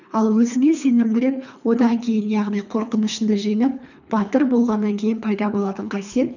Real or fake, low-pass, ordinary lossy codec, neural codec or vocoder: fake; 7.2 kHz; none; codec, 24 kHz, 3 kbps, HILCodec